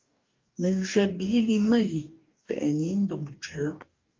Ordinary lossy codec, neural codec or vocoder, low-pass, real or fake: Opus, 24 kbps; codec, 44.1 kHz, 2.6 kbps, DAC; 7.2 kHz; fake